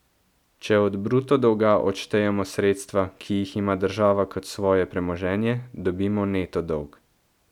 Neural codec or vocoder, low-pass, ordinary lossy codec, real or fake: none; 19.8 kHz; none; real